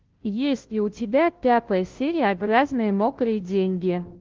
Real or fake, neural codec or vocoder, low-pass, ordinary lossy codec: fake; codec, 16 kHz, 0.5 kbps, FunCodec, trained on Chinese and English, 25 frames a second; 7.2 kHz; Opus, 16 kbps